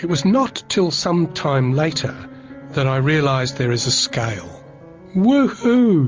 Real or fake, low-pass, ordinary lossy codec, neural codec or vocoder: real; 7.2 kHz; Opus, 32 kbps; none